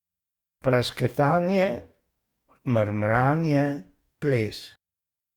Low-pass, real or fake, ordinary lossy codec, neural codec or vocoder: 19.8 kHz; fake; Opus, 64 kbps; codec, 44.1 kHz, 2.6 kbps, DAC